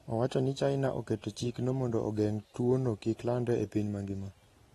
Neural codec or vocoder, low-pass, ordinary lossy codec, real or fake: none; 19.8 kHz; AAC, 32 kbps; real